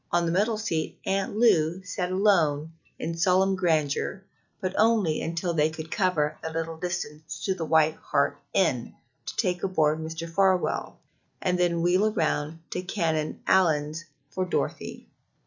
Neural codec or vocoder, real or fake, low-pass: none; real; 7.2 kHz